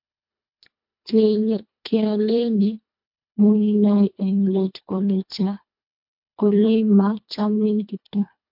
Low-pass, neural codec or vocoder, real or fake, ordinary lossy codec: 5.4 kHz; codec, 24 kHz, 1.5 kbps, HILCodec; fake; MP3, 48 kbps